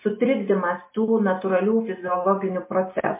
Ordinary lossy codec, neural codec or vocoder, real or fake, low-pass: MP3, 24 kbps; none; real; 3.6 kHz